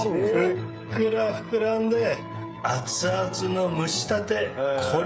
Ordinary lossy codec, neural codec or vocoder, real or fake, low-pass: none; codec, 16 kHz, 16 kbps, FreqCodec, smaller model; fake; none